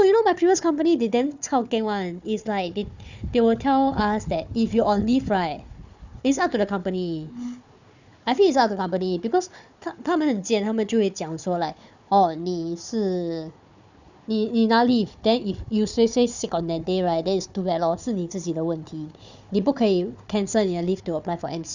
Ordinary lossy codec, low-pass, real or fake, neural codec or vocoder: none; 7.2 kHz; fake; codec, 16 kHz, 4 kbps, FunCodec, trained on Chinese and English, 50 frames a second